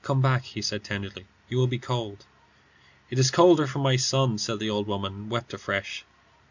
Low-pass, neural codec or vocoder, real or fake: 7.2 kHz; none; real